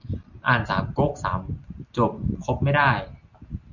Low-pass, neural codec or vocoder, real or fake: 7.2 kHz; none; real